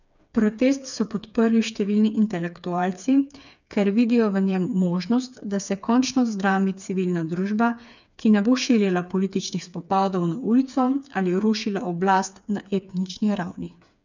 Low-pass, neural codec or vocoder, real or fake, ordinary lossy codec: 7.2 kHz; codec, 16 kHz, 4 kbps, FreqCodec, smaller model; fake; none